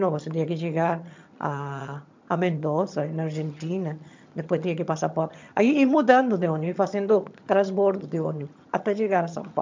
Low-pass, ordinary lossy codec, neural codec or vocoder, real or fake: 7.2 kHz; MP3, 64 kbps; vocoder, 22.05 kHz, 80 mel bands, HiFi-GAN; fake